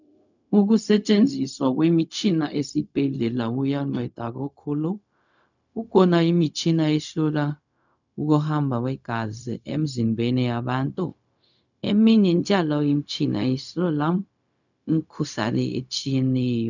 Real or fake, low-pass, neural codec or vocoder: fake; 7.2 kHz; codec, 16 kHz, 0.4 kbps, LongCat-Audio-Codec